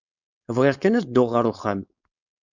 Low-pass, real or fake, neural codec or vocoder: 7.2 kHz; fake; codec, 16 kHz, 4.8 kbps, FACodec